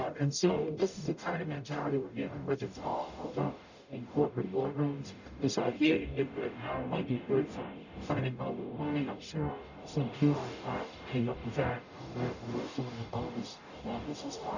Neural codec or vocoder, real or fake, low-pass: codec, 44.1 kHz, 0.9 kbps, DAC; fake; 7.2 kHz